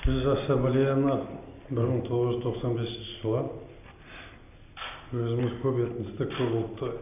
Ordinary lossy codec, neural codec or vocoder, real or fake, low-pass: none; none; real; 3.6 kHz